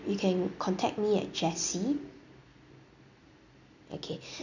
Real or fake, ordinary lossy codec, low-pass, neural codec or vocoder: real; none; 7.2 kHz; none